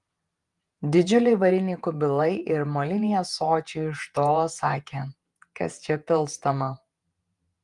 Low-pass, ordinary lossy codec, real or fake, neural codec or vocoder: 10.8 kHz; Opus, 32 kbps; fake; vocoder, 48 kHz, 128 mel bands, Vocos